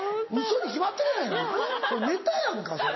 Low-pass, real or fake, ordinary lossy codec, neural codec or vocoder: 7.2 kHz; real; MP3, 24 kbps; none